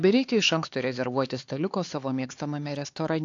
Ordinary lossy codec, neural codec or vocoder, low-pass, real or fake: AAC, 48 kbps; codec, 16 kHz, 4 kbps, X-Codec, HuBERT features, trained on LibriSpeech; 7.2 kHz; fake